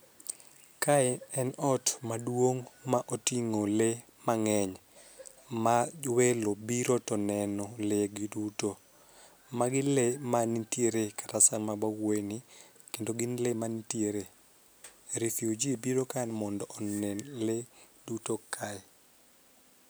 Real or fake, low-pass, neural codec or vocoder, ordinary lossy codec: real; none; none; none